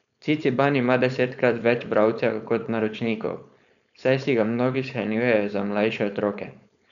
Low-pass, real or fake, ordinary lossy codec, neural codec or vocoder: 7.2 kHz; fake; none; codec, 16 kHz, 4.8 kbps, FACodec